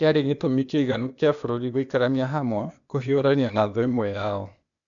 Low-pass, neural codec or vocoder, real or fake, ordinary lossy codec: 7.2 kHz; codec, 16 kHz, 0.8 kbps, ZipCodec; fake; none